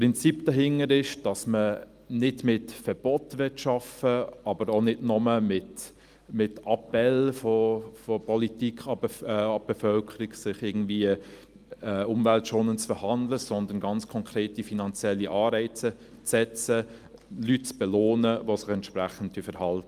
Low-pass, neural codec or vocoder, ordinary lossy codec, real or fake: 14.4 kHz; none; Opus, 32 kbps; real